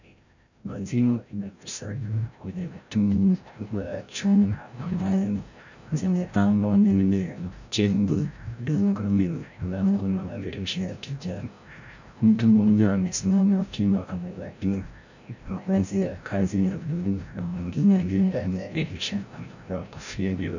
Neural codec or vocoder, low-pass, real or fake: codec, 16 kHz, 0.5 kbps, FreqCodec, larger model; 7.2 kHz; fake